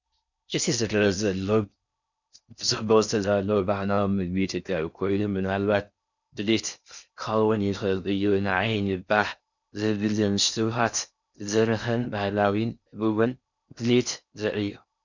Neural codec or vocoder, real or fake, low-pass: codec, 16 kHz in and 24 kHz out, 0.6 kbps, FocalCodec, streaming, 4096 codes; fake; 7.2 kHz